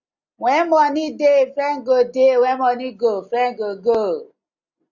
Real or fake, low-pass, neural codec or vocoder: real; 7.2 kHz; none